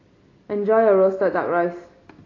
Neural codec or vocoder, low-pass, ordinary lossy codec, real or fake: none; 7.2 kHz; AAC, 32 kbps; real